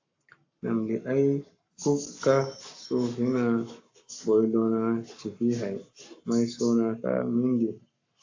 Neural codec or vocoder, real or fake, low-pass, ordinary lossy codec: codec, 44.1 kHz, 7.8 kbps, Pupu-Codec; fake; 7.2 kHz; AAC, 48 kbps